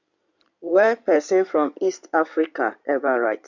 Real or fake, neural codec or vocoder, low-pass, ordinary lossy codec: fake; codec, 16 kHz in and 24 kHz out, 2.2 kbps, FireRedTTS-2 codec; 7.2 kHz; none